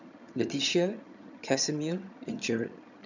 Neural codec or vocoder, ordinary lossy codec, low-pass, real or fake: vocoder, 22.05 kHz, 80 mel bands, HiFi-GAN; none; 7.2 kHz; fake